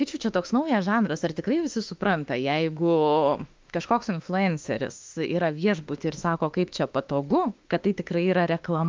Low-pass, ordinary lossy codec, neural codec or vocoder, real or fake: 7.2 kHz; Opus, 24 kbps; autoencoder, 48 kHz, 32 numbers a frame, DAC-VAE, trained on Japanese speech; fake